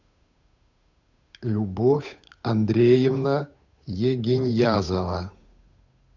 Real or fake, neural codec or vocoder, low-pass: fake; codec, 16 kHz, 8 kbps, FunCodec, trained on Chinese and English, 25 frames a second; 7.2 kHz